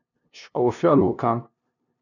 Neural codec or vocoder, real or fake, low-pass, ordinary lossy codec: codec, 16 kHz, 0.5 kbps, FunCodec, trained on LibriTTS, 25 frames a second; fake; 7.2 kHz; none